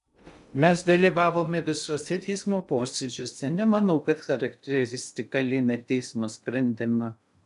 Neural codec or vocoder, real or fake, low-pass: codec, 16 kHz in and 24 kHz out, 0.6 kbps, FocalCodec, streaming, 2048 codes; fake; 10.8 kHz